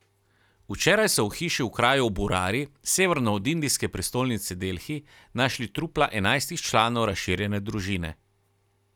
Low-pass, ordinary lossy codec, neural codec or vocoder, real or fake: 19.8 kHz; none; none; real